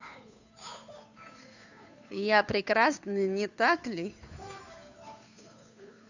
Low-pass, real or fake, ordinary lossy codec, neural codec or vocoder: 7.2 kHz; fake; none; codec, 16 kHz, 2 kbps, FunCodec, trained on Chinese and English, 25 frames a second